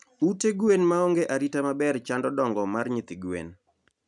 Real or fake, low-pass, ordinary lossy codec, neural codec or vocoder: real; 10.8 kHz; none; none